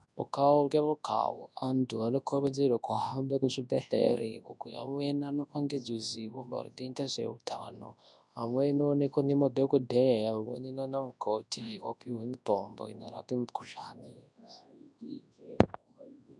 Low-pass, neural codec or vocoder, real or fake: 10.8 kHz; codec, 24 kHz, 0.9 kbps, WavTokenizer, large speech release; fake